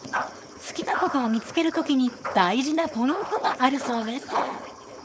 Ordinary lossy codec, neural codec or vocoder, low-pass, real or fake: none; codec, 16 kHz, 4.8 kbps, FACodec; none; fake